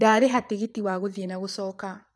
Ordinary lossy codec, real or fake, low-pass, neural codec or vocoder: none; fake; none; vocoder, 22.05 kHz, 80 mel bands, Vocos